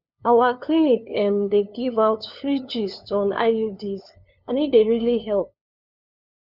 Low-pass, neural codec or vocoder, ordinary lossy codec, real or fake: 5.4 kHz; codec, 16 kHz, 2 kbps, FunCodec, trained on LibriTTS, 25 frames a second; none; fake